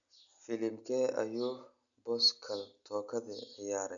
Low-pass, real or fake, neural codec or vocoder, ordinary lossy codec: 7.2 kHz; real; none; none